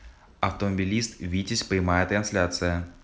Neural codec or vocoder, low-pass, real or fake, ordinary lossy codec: none; none; real; none